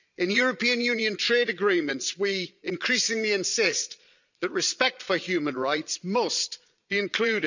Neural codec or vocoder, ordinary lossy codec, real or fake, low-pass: vocoder, 22.05 kHz, 80 mel bands, Vocos; none; fake; 7.2 kHz